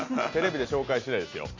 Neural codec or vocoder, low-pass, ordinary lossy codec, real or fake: none; 7.2 kHz; MP3, 64 kbps; real